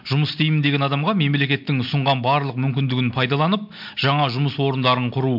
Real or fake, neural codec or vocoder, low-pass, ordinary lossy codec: real; none; 5.4 kHz; MP3, 48 kbps